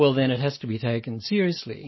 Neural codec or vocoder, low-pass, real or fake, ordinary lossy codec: none; 7.2 kHz; real; MP3, 24 kbps